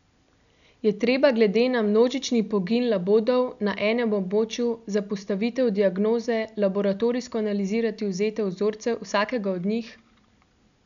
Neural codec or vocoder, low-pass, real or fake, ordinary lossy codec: none; 7.2 kHz; real; none